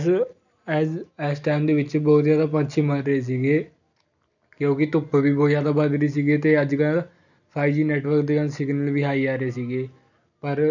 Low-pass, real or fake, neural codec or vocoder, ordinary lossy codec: 7.2 kHz; real; none; none